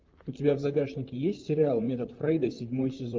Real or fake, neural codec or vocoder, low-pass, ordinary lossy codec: fake; vocoder, 44.1 kHz, 128 mel bands, Pupu-Vocoder; 7.2 kHz; Opus, 32 kbps